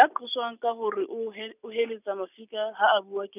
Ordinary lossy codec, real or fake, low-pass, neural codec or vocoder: none; real; 3.6 kHz; none